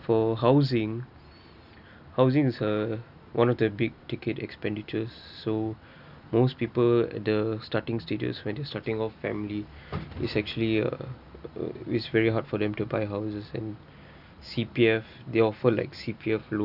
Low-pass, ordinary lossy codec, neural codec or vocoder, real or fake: 5.4 kHz; none; none; real